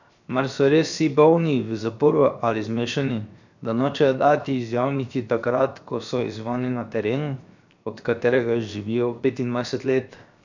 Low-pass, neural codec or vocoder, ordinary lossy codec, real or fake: 7.2 kHz; codec, 16 kHz, 0.7 kbps, FocalCodec; none; fake